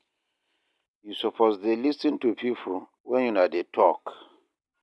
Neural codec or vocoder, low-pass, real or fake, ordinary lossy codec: none; none; real; none